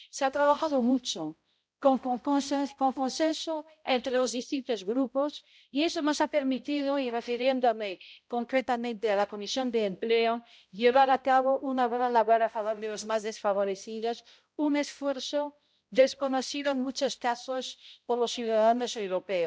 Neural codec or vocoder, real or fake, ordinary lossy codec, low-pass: codec, 16 kHz, 0.5 kbps, X-Codec, HuBERT features, trained on balanced general audio; fake; none; none